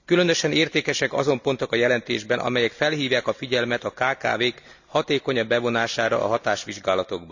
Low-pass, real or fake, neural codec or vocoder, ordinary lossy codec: 7.2 kHz; real; none; none